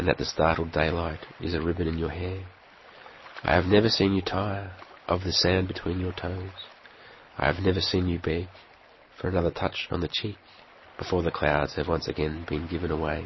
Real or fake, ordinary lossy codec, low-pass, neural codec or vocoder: real; MP3, 24 kbps; 7.2 kHz; none